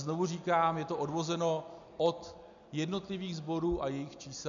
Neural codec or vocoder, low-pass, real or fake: none; 7.2 kHz; real